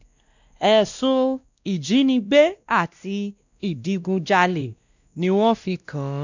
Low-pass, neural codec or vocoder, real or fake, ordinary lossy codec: 7.2 kHz; codec, 16 kHz, 1 kbps, X-Codec, WavLM features, trained on Multilingual LibriSpeech; fake; none